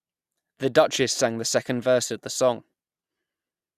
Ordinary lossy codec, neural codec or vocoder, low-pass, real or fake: Opus, 64 kbps; none; 14.4 kHz; real